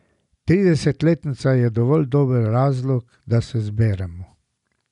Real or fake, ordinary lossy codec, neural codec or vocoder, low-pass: real; none; none; 10.8 kHz